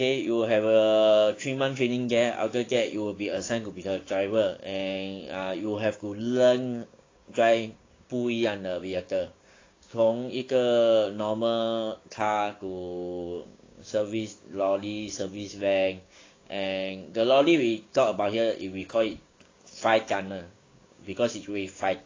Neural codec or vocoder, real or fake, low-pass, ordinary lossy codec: codec, 16 kHz, 6 kbps, DAC; fake; 7.2 kHz; AAC, 32 kbps